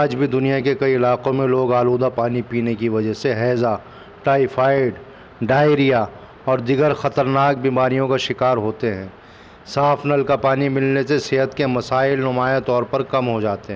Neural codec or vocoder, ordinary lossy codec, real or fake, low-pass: none; none; real; none